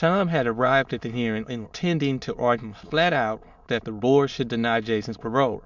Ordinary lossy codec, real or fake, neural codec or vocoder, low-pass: MP3, 64 kbps; fake; autoencoder, 22.05 kHz, a latent of 192 numbers a frame, VITS, trained on many speakers; 7.2 kHz